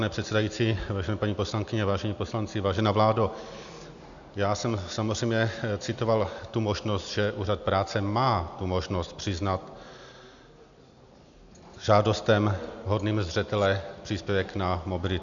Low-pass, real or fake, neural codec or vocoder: 7.2 kHz; real; none